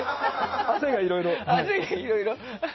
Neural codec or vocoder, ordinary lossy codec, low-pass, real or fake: none; MP3, 24 kbps; 7.2 kHz; real